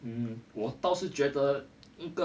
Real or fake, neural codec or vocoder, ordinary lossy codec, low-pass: real; none; none; none